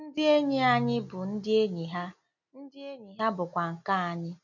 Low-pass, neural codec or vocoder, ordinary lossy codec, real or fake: 7.2 kHz; none; none; real